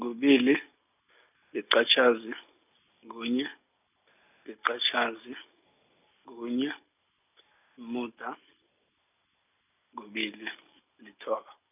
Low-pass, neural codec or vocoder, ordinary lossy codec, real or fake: 3.6 kHz; none; none; real